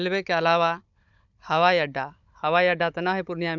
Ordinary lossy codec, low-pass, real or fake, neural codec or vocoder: none; 7.2 kHz; fake; codec, 16 kHz, 16 kbps, FunCodec, trained on Chinese and English, 50 frames a second